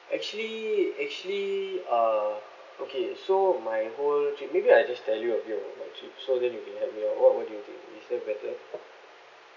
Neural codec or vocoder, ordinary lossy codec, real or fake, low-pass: none; none; real; 7.2 kHz